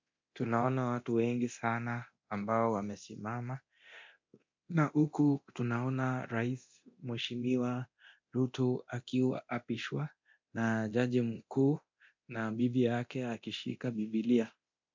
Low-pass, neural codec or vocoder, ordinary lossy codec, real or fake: 7.2 kHz; codec, 24 kHz, 0.9 kbps, DualCodec; MP3, 48 kbps; fake